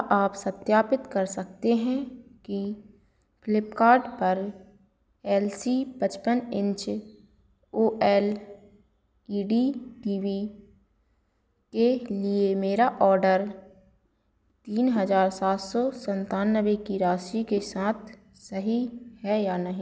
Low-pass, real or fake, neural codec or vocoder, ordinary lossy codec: none; real; none; none